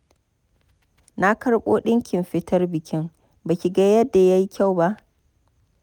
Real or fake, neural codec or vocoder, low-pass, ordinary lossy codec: real; none; 19.8 kHz; none